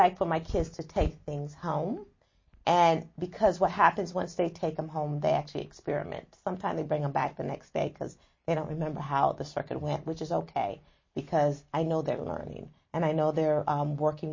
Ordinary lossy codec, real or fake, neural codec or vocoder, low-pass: MP3, 32 kbps; real; none; 7.2 kHz